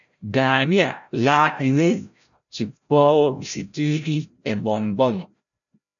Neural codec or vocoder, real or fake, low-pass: codec, 16 kHz, 0.5 kbps, FreqCodec, larger model; fake; 7.2 kHz